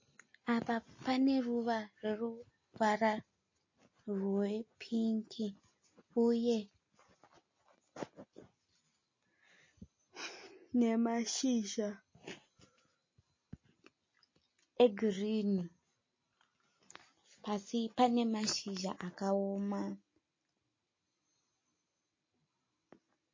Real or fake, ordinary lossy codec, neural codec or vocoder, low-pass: real; MP3, 32 kbps; none; 7.2 kHz